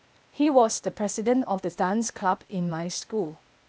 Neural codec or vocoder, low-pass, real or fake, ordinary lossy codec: codec, 16 kHz, 0.8 kbps, ZipCodec; none; fake; none